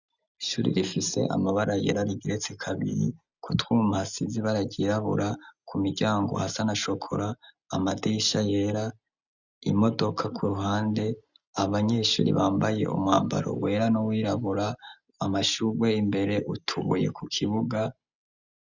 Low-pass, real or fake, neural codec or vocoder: 7.2 kHz; real; none